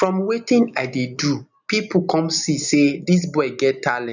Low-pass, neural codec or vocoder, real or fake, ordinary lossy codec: 7.2 kHz; none; real; none